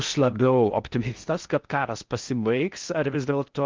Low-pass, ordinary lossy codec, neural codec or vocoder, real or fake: 7.2 kHz; Opus, 32 kbps; codec, 16 kHz in and 24 kHz out, 0.6 kbps, FocalCodec, streaming, 4096 codes; fake